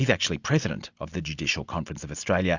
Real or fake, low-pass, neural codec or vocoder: real; 7.2 kHz; none